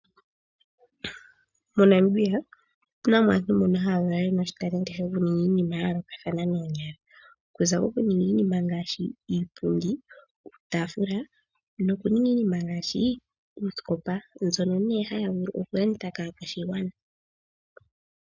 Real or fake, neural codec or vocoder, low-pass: real; none; 7.2 kHz